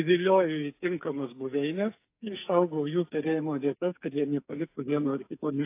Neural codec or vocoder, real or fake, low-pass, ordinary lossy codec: codec, 44.1 kHz, 2.6 kbps, SNAC; fake; 3.6 kHz; AAC, 24 kbps